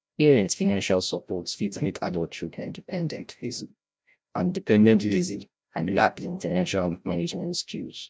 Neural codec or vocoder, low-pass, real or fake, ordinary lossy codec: codec, 16 kHz, 0.5 kbps, FreqCodec, larger model; none; fake; none